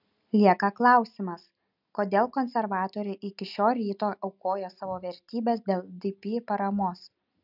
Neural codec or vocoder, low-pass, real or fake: none; 5.4 kHz; real